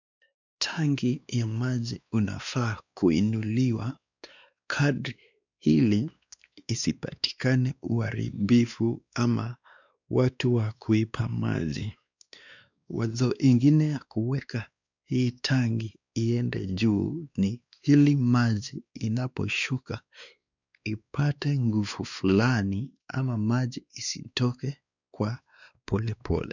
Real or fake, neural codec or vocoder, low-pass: fake; codec, 16 kHz, 2 kbps, X-Codec, WavLM features, trained on Multilingual LibriSpeech; 7.2 kHz